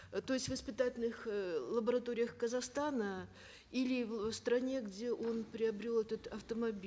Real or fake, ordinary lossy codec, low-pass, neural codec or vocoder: real; none; none; none